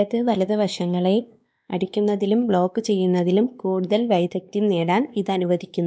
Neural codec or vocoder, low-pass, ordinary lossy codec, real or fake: codec, 16 kHz, 2 kbps, X-Codec, WavLM features, trained on Multilingual LibriSpeech; none; none; fake